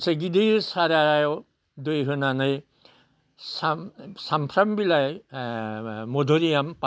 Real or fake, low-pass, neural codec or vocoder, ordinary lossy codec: real; none; none; none